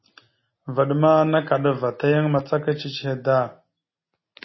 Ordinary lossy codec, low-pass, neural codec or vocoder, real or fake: MP3, 24 kbps; 7.2 kHz; none; real